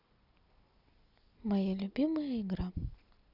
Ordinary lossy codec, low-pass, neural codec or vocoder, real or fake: none; 5.4 kHz; none; real